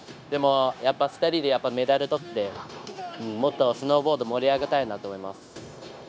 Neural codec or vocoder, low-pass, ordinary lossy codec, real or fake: codec, 16 kHz, 0.9 kbps, LongCat-Audio-Codec; none; none; fake